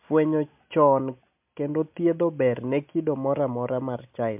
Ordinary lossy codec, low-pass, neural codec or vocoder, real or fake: MP3, 32 kbps; 3.6 kHz; none; real